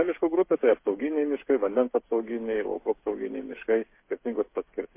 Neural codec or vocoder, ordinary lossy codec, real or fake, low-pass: codec, 16 kHz, 8 kbps, FreqCodec, smaller model; MP3, 24 kbps; fake; 3.6 kHz